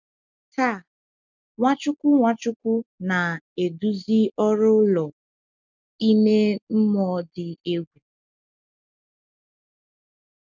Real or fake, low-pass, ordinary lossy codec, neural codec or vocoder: real; 7.2 kHz; none; none